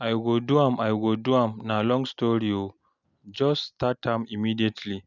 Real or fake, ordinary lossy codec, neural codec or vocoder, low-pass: real; none; none; 7.2 kHz